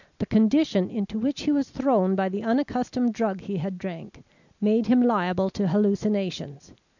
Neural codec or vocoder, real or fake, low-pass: none; real; 7.2 kHz